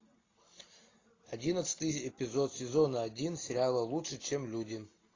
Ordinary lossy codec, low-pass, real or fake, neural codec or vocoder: AAC, 32 kbps; 7.2 kHz; real; none